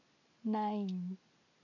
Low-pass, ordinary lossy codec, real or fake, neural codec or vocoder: 7.2 kHz; none; real; none